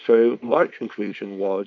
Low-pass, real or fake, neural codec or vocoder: 7.2 kHz; fake; codec, 24 kHz, 0.9 kbps, WavTokenizer, small release